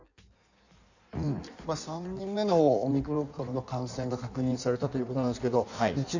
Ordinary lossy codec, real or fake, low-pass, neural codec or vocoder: none; fake; 7.2 kHz; codec, 16 kHz in and 24 kHz out, 1.1 kbps, FireRedTTS-2 codec